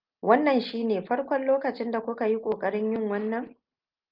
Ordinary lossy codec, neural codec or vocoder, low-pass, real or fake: Opus, 24 kbps; none; 5.4 kHz; real